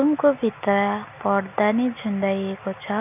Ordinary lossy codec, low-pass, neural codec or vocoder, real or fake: none; 3.6 kHz; none; real